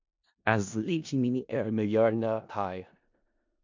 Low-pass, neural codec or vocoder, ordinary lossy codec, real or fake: 7.2 kHz; codec, 16 kHz in and 24 kHz out, 0.4 kbps, LongCat-Audio-Codec, four codebook decoder; MP3, 48 kbps; fake